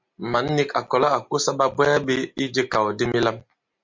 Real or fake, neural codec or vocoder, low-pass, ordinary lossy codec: real; none; 7.2 kHz; MP3, 48 kbps